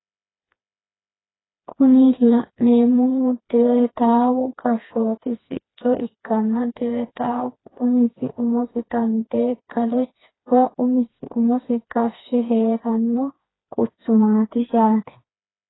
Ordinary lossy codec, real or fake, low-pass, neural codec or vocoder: AAC, 16 kbps; fake; 7.2 kHz; codec, 16 kHz, 2 kbps, FreqCodec, smaller model